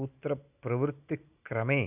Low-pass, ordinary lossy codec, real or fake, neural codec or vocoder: 3.6 kHz; none; real; none